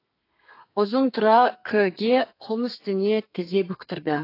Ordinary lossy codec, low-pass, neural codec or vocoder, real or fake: AAC, 32 kbps; 5.4 kHz; codec, 32 kHz, 1.9 kbps, SNAC; fake